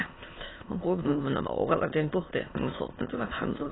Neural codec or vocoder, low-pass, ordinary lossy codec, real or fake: autoencoder, 22.05 kHz, a latent of 192 numbers a frame, VITS, trained on many speakers; 7.2 kHz; AAC, 16 kbps; fake